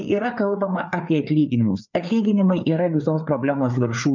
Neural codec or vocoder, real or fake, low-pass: codec, 16 kHz, 4 kbps, FreqCodec, larger model; fake; 7.2 kHz